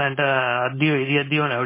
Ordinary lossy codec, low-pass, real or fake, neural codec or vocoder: MP3, 16 kbps; 3.6 kHz; fake; codec, 16 kHz, 4.8 kbps, FACodec